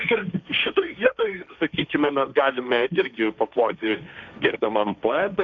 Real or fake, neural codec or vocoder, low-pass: fake; codec, 16 kHz, 1.1 kbps, Voila-Tokenizer; 7.2 kHz